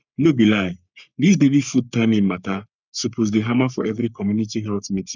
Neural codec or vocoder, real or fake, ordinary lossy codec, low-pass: codec, 44.1 kHz, 3.4 kbps, Pupu-Codec; fake; none; 7.2 kHz